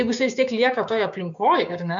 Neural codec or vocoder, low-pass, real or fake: codec, 16 kHz, 6 kbps, DAC; 7.2 kHz; fake